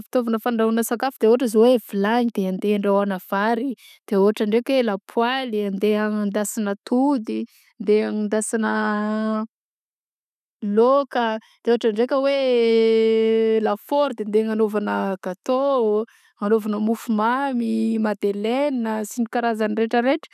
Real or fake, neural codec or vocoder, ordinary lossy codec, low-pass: real; none; none; 14.4 kHz